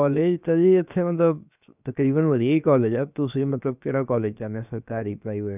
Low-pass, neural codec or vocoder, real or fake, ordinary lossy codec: 3.6 kHz; codec, 16 kHz, 0.7 kbps, FocalCodec; fake; none